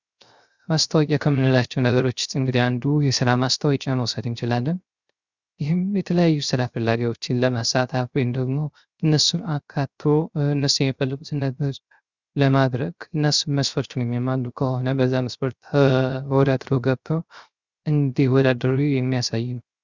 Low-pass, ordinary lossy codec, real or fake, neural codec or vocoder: 7.2 kHz; Opus, 64 kbps; fake; codec, 16 kHz, 0.3 kbps, FocalCodec